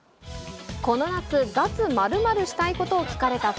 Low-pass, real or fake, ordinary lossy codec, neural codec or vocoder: none; real; none; none